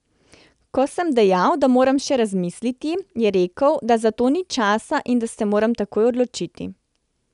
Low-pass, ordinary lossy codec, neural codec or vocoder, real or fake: 10.8 kHz; none; none; real